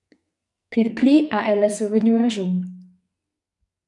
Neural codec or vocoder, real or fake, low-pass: codec, 44.1 kHz, 2.6 kbps, SNAC; fake; 10.8 kHz